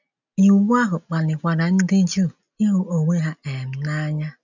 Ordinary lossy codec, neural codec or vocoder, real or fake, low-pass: none; none; real; 7.2 kHz